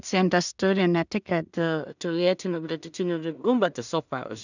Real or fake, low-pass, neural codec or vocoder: fake; 7.2 kHz; codec, 16 kHz in and 24 kHz out, 0.4 kbps, LongCat-Audio-Codec, two codebook decoder